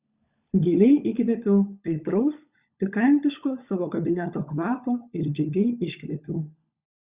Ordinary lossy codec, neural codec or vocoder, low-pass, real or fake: Opus, 64 kbps; codec, 16 kHz, 16 kbps, FunCodec, trained on LibriTTS, 50 frames a second; 3.6 kHz; fake